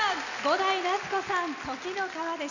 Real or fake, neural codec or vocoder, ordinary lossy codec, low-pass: real; none; none; 7.2 kHz